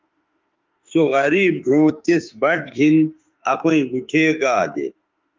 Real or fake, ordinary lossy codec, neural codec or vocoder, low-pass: fake; Opus, 24 kbps; autoencoder, 48 kHz, 32 numbers a frame, DAC-VAE, trained on Japanese speech; 7.2 kHz